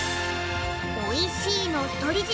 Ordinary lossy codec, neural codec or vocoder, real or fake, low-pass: none; none; real; none